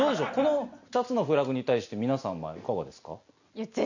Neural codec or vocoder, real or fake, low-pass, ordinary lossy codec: none; real; 7.2 kHz; none